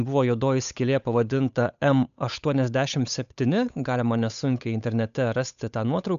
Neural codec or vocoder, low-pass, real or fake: codec, 16 kHz, 8 kbps, FunCodec, trained on Chinese and English, 25 frames a second; 7.2 kHz; fake